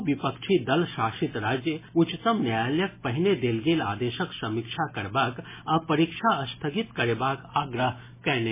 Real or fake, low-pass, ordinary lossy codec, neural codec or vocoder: real; 3.6 kHz; MP3, 16 kbps; none